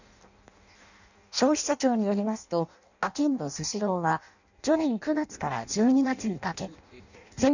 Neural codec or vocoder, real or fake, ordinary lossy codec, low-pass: codec, 16 kHz in and 24 kHz out, 0.6 kbps, FireRedTTS-2 codec; fake; none; 7.2 kHz